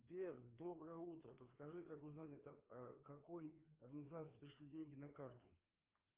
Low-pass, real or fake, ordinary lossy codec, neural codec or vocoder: 3.6 kHz; fake; Opus, 32 kbps; codec, 16 kHz, 2 kbps, FreqCodec, larger model